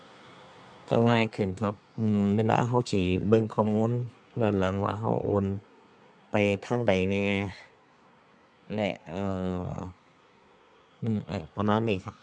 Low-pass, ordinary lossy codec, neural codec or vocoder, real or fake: 9.9 kHz; none; codec, 24 kHz, 1 kbps, SNAC; fake